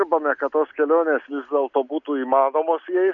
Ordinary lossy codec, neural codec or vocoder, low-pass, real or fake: MP3, 96 kbps; none; 7.2 kHz; real